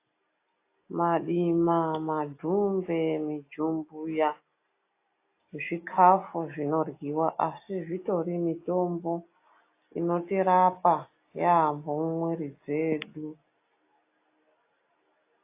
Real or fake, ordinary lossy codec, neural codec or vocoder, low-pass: real; AAC, 24 kbps; none; 3.6 kHz